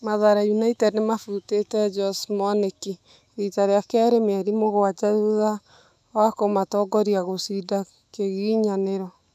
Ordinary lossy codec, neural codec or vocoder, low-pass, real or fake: none; autoencoder, 48 kHz, 128 numbers a frame, DAC-VAE, trained on Japanese speech; 14.4 kHz; fake